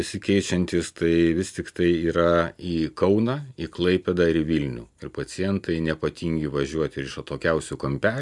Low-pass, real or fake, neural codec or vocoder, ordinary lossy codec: 10.8 kHz; real; none; MP3, 96 kbps